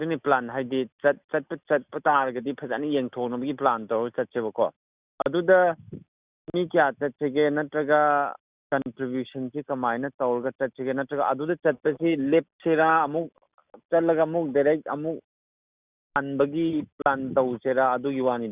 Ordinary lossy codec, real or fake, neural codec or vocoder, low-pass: Opus, 64 kbps; real; none; 3.6 kHz